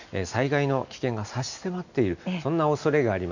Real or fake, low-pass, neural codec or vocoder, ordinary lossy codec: real; 7.2 kHz; none; none